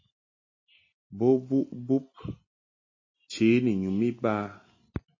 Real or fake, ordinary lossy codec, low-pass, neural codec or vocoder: real; MP3, 32 kbps; 7.2 kHz; none